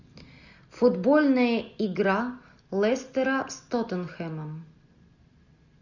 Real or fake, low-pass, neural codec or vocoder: real; 7.2 kHz; none